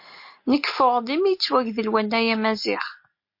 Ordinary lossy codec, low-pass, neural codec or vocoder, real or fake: MP3, 32 kbps; 5.4 kHz; none; real